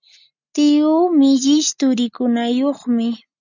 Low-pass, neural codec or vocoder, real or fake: 7.2 kHz; none; real